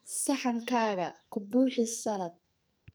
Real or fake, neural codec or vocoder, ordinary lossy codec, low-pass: fake; codec, 44.1 kHz, 2.6 kbps, SNAC; none; none